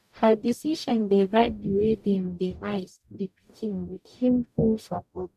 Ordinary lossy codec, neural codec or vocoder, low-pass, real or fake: none; codec, 44.1 kHz, 0.9 kbps, DAC; 14.4 kHz; fake